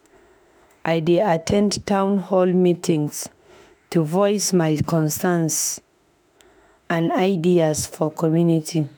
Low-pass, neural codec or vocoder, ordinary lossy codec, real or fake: none; autoencoder, 48 kHz, 32 numbers a frame, DAC-VAE, trained on Japanese speech; none; fake